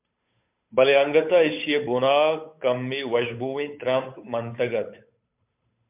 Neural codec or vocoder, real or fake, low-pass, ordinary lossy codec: codec, 16 kHz, 8 kbps, FunCodec, trained on Chinese and English, 25 frames a second; fake; 3.6 kHz; MP3, 32 kbps